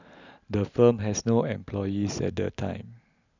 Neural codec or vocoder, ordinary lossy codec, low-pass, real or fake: none; none; 7.2 kHz; real